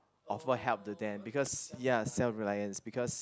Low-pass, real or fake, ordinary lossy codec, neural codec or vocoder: none; real; none; none